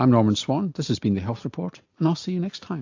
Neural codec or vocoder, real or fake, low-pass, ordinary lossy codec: none; real; 7.2 kHz; AAC, 48 kbps